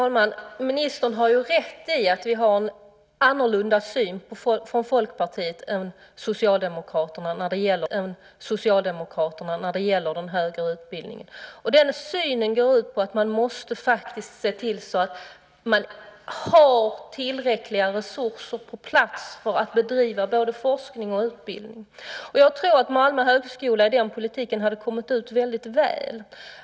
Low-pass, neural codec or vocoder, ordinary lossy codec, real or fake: none; none; none; real